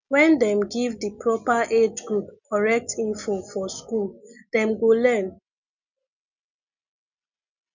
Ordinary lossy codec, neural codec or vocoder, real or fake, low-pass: none; none; real; 7.2 kHz